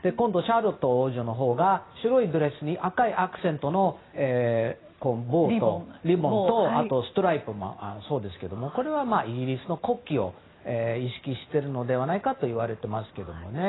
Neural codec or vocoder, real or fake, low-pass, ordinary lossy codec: none; real; 7.2 kHz; AAC, 16 kbps